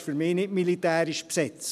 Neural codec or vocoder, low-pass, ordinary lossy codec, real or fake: none; 14.4 kHz; none; real